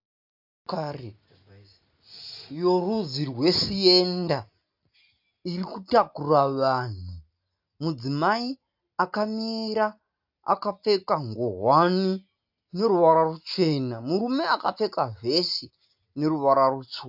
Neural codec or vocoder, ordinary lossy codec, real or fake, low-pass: none; AAC, 48 kbps; real; 5.4 kHz